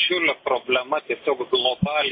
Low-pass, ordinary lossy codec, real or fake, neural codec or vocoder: 5.4 kHz; MP3, 24 kbps; real; none